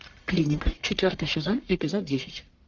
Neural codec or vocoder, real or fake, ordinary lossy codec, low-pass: codec, 44.1 kHz, 1.7 kbps, Pupu-Codec; fake; Opus, 32 kbps; 7.2 kHz